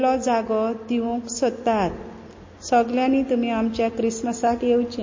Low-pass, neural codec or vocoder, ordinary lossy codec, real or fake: 7.2 kHz; none; MP3, 32 kbps; real